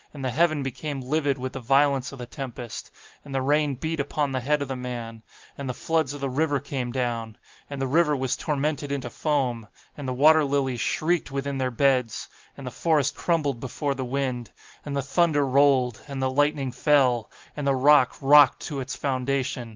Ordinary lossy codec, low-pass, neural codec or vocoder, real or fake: Opus, 32 kbps; 7.2 kHz; none; real